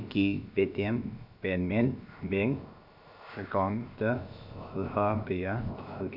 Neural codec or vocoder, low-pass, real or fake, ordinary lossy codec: codec, 16 kHz, about 1 kbps, DyCAST, with the encoder's durations; 5.4 kHz; fake; AAC, 48 kbps